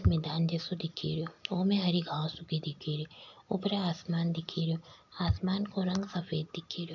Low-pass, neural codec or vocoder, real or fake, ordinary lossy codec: 7.2 kHz; none; real; none